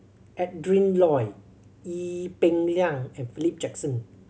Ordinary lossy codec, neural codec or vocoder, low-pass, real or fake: none; none; none; real